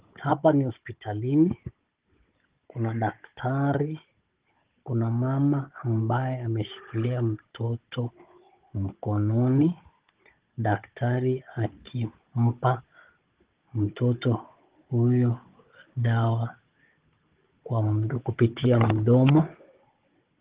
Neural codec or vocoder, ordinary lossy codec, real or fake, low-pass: codec, 16 kHz, 16 kbps, FunCodec, trained on Chinese and English, 50 frames a second; Opus, 16 kbps; fake; 3.6 kHz